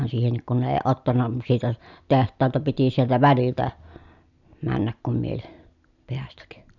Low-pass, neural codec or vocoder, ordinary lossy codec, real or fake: 7.2 kHz; none; none; real